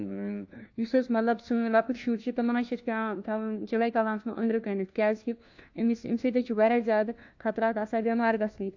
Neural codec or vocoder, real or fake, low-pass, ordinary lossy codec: codec, 16 kHz, 1 kbps, FunCodec, trained on LibriTTS, 50 frames a second; fake; 7.2 kHz; AAC, 48 kbps